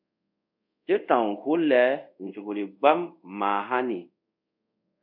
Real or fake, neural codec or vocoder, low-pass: fake; codec, 24 kHz, 0.5 kbps, DualCodec; 5.4 kHz